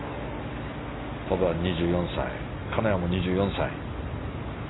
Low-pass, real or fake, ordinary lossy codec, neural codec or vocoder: 7.2 kHz; real; AAC, 16 kbps; none